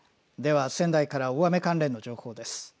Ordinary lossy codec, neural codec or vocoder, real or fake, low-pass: none; none; real; none